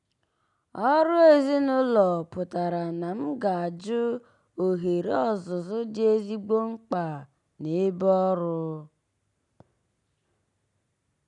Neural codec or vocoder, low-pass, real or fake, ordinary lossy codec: none; 10.8 kHz; real; none